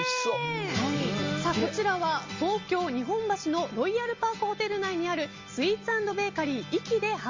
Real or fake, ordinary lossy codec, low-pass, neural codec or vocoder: real; Opus, 32 kbps; 7.2 kHz; none